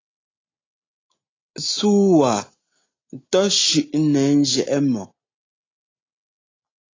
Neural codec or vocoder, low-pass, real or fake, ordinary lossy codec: none; 7.2 kHz; real; AAC, 32 kbps